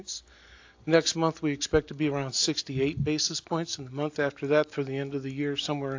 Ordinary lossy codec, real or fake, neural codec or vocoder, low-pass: AAC, 48 kbps; real; none; 7.2 kHz